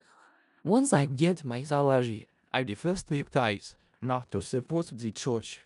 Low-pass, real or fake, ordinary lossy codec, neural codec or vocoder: 10.8 kHz; fake; none; codec, 16 kHz in and 24 kHz out, 0.4 kbps, LongCat-Audio-Codec, four codebook decoder